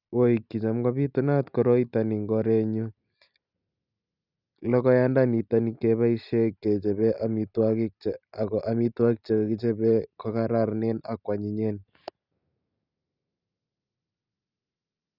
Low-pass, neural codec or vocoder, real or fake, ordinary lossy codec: 5.4 kHz; none; real; none